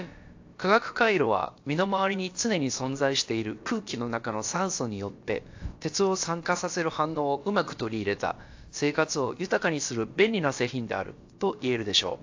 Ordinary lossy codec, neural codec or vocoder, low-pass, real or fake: AAC, 48 kbps; codec, 16 kHz, about 1 kbps, DyCAST, with the encoder's durations; 7.2 kHz; fake